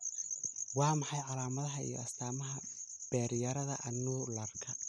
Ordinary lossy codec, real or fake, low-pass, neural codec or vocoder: none; real; none; none